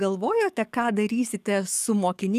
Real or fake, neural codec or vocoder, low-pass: fake; codec, 44.1 kHz, 7.8 kbps, DAC; 14.4 kHz